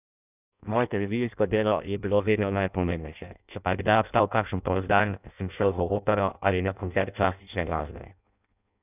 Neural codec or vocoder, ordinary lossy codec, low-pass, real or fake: codec, 16 kHz in and 24 kHz out, 0.6 kbps, FireRedTTS-2 codec; none; 3.6 kHz; fake